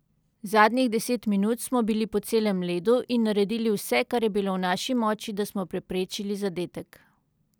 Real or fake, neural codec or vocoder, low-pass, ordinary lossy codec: real; none; none; none